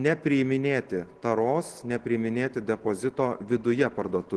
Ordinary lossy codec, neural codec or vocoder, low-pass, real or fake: Opus, 16 kbps; none; 10.8 kHz; real